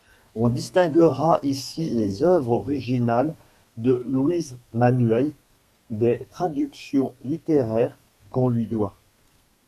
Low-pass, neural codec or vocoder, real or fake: 14.4 kHz; codec, 32 kHz, 1.9 kbps, SNAC; fake